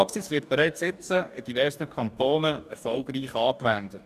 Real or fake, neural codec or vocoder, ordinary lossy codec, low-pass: fake; codec, 44.1 kHz, 2.6 kbps, DAC; none; 14.4 kHz